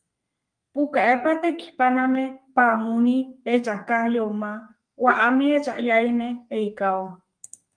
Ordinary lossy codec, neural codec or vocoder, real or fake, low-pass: Opus, 32 kbps; codec, 32 kHz, 1.9 kbps, SNAC; fake; 9.9 kHz